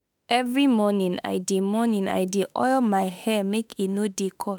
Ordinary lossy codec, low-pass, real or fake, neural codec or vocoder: none; none; fake; autoencoder, 48 kHz, 32 numbers a frame, DAC-VAE, trained on Japanese speech